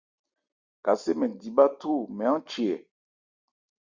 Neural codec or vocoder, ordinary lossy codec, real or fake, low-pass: none; Opus, 64 kbps; real; 7.2 kHz